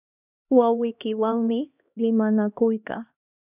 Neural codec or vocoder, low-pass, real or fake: codec, 16 kHz, 1 kbps, X-Codec, HuBERT features, trained on LibriSpeech; 3.6 kHz; fake